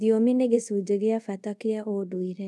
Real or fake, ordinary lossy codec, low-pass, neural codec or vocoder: fake; none; none; codec, 24 kHz, 0.5 kbps, DualCodec